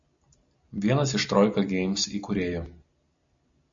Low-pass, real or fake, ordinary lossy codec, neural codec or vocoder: 7.2 kHz; real; MP3, 64 kbps; none